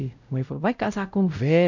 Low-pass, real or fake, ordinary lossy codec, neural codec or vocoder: 7.2 kHz; fake; none; codec, 16 kHz, 0.5 kbps, X-Codec, WavLM features, trained on Multilingual LibriSpeech